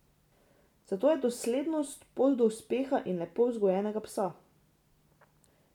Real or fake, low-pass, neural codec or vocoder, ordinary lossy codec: real; 19.8 kHz; none; none